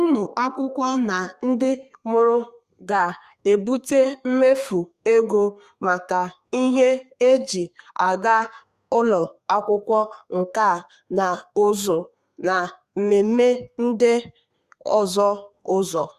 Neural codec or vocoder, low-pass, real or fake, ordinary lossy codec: codec, 32 kHz, 1.9 kbps, SNAC; 14.4 kHz; fake; Opus, 64 kbps